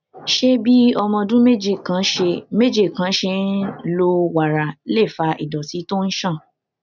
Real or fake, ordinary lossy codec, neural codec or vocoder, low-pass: real; none; none; 7.2 kHz